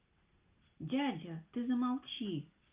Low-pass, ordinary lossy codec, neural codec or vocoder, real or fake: 3.6 kHz; Opus, 24 kbps; none; real